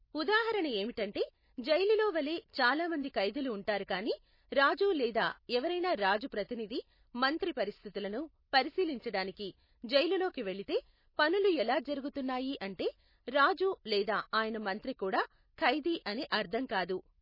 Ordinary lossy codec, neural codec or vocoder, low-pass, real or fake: MP3, 24 kbps; none; 5.4 kHz; real